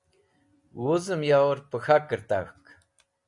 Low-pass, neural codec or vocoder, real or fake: 10.8 kHz; none; real